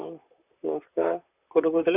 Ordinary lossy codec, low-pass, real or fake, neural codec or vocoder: none; 3.6 kHz; fake; vocoder, 44.1 kHz, 128 mel bands, Pupu-Vocoder